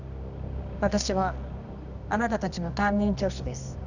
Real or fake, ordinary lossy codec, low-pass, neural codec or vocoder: fake; none; 7.2 kHz; codec, 24 kHz, 0.9 kbps, WavTokenizer, medium music audio release